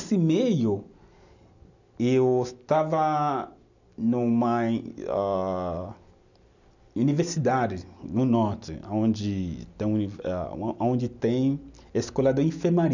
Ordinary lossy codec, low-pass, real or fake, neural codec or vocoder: none; 7.2 kHz; real; none